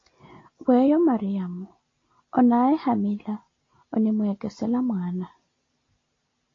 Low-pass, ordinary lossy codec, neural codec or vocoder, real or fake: 7.2 kHz; AAC, 32 kbps; none; real